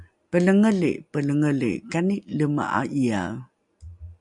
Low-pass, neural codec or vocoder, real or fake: 10.8 kHz; none; real